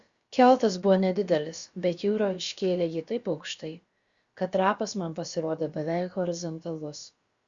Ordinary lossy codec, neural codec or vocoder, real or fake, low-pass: Opus, 64 kbps; codec, 16 kHz, about 1 kbps, DyCAST, with the encoder's durations; fake; 7.2 kHz